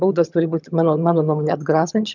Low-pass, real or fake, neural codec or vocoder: 7.2 kHz; fake; vocoder, 22.05 kHz, 80 mel bands, HiFi-GAN